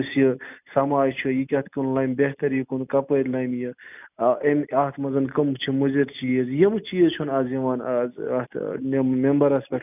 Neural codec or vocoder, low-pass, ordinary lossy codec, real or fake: none; 3.6 kHz; none; real